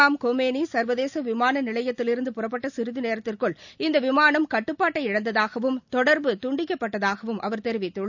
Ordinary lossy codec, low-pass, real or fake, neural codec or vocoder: none; 7.2 kHz; real; none